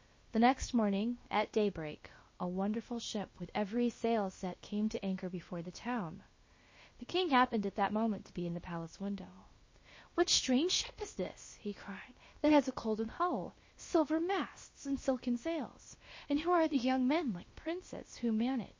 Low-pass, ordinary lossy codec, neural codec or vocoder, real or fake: 7.2 kHz; MP3, 32 kbps; codec, 16 kHz, about 1 kbps, DyCAST, with the encoder's durations; fake